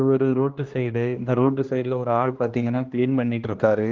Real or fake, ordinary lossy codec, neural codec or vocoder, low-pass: fake; Opus, 32 kbps; codec, 16 kHz, 1 kbps, X-Codec, HuBERT features, trained on general audio; 7.2 kHz